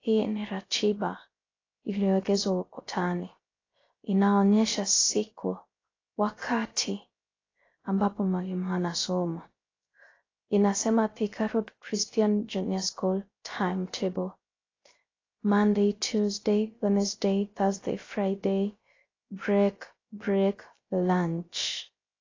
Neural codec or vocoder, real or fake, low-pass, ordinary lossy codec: codec, 16 kHz, 0.3 kbps, FocalCodec; fake; 7.2 kHz; AAC, 32 kbps